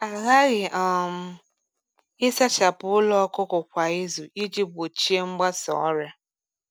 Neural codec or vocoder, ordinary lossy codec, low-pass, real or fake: none; none; none; real